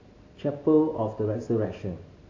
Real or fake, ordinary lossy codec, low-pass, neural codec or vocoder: real; AAC, 32 kbps; 7.2 kHz; none